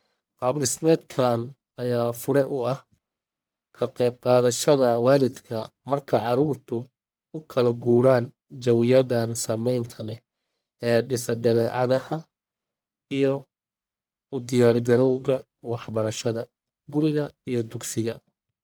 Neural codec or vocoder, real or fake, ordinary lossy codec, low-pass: codec, 44.1 kHz, 1.7 kbps, Pupu-Codec; fake; none; none